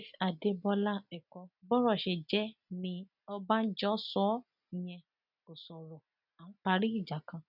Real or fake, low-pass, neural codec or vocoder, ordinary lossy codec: real; 5.4 kHz; none; none